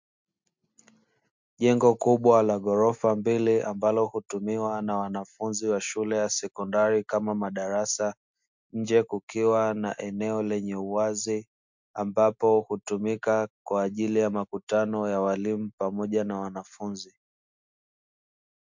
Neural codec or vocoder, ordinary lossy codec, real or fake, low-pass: none; MP3, 64 kbps; real; 7.2 kHz